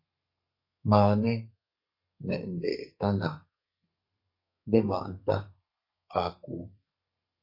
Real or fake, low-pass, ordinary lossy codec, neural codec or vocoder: fake; 5.4 kHz; MP3, 32 kbps; codec, 32 kHz, 1.9 kbps, SNAC